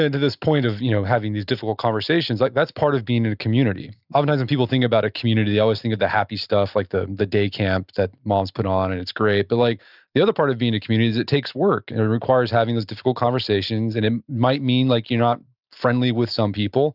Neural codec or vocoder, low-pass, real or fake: none; 5.4 kHz; real